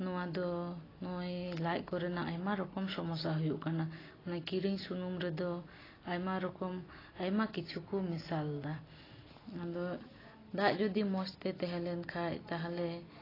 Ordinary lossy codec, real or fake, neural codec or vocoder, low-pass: AAC, 24 kbps; real; none; 5.4 kHz